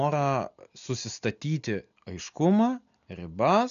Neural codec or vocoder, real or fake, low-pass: none; real; 7.2 kHz